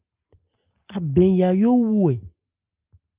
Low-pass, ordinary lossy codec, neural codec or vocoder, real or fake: 3.6 kHz; Opus, 24 kbps; none; real